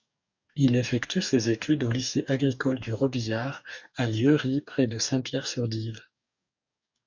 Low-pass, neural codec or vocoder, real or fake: 7.2 kHz; codec, 44.1 kHz, 2.6 kbps, DAC; fake